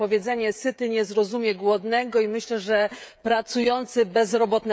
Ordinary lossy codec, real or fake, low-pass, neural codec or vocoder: none; fake; none; codec, 16 kHz, 16 kbps, FreqCodec, smaller model